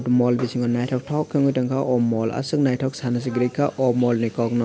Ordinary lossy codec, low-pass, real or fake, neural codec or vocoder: none; none; real; none